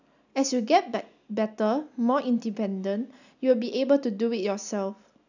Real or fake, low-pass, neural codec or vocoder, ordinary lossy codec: real; 7.2 kHz; none; none